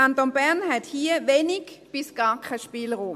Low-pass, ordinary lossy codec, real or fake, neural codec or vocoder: 14.4 kHz; MP3, 64 kbps; real; none